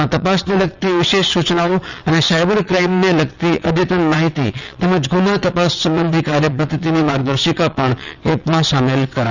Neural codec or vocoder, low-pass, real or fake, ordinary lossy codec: vocoder, 22.05 kHz, 80 mel bands, WaveNeXt; 7.2 kHz; fake; none